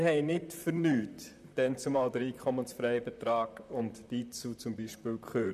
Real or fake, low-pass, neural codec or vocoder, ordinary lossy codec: fake; 14.4 kHz; vocoder, 44.1 kHz, 128 mel bands, Pupu-Vocoder; none